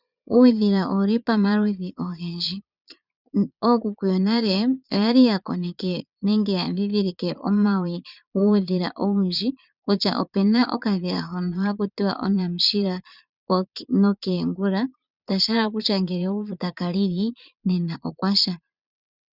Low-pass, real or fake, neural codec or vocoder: 5.4 kHz; fake; vocoder, 22.05 kHz, 80 mel bands, Vocos